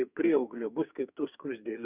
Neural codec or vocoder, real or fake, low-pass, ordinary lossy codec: codec, 44.1 kHz, 2.6 kbps, SNAC; fake; 3.6 kHz; Opus, 64 kbps